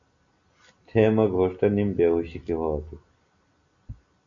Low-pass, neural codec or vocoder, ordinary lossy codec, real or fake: 7.2 kHz; none; AAC, 64 kbps; real